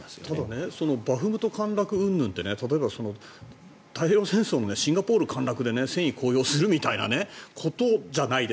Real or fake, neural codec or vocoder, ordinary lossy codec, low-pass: real; none; none; none